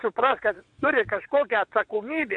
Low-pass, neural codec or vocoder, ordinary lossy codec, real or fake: 9.9 kHz; vocoder, 22.05 kHz, 80 mel bands, WaveNeXt; AAC, 64 kbps; fake